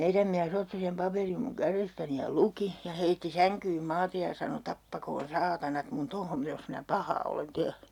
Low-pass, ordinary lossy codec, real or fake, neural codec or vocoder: 19.8 kHz; none; real; none